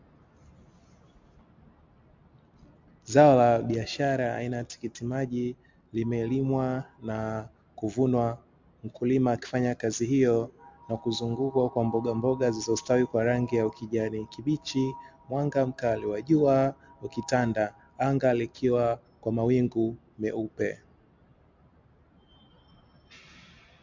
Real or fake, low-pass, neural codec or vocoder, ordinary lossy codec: real; 7.2 kHz; none; MP3, 64 kbps